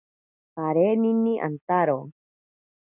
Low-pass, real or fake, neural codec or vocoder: 3.6 kHz; real; none